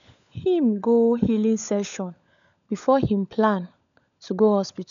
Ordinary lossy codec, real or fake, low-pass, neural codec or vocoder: none; fake; 7.2 kHz; codec, 16 kHz, 16 kbps, FunCodec, trained on LibriTTS, 50 frames a second